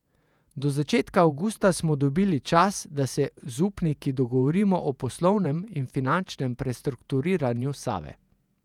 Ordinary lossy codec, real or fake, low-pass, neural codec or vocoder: none; fake; 19.8 kHz; vocoder, 48 kHz, 128 mel bands, Vocos